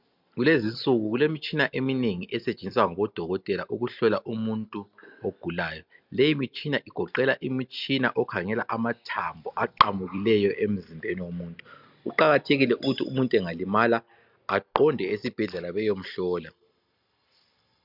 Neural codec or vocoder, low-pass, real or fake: none; 5.4 kHz; real